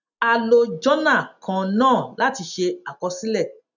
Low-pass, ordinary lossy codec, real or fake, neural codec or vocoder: 7.2 kHz; none; real; none